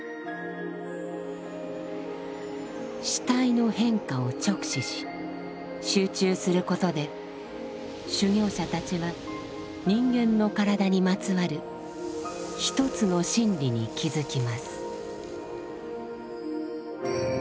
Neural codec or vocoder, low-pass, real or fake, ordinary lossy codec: none; none; real; none